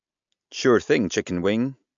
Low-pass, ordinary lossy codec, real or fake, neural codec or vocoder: 7.2 kHz; MP3, 64 kbps; real; none